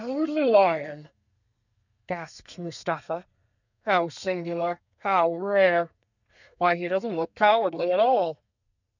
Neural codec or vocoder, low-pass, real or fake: codec, 32 kHz, 1.9 kbps, SNAC; 7.2 kHz; fake